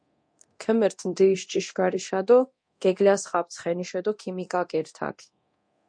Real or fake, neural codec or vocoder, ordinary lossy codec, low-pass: fake; codec, 24 kHz, 0.9 kbps, DualCodec; MP3, 48 kbps; 9.9 kHz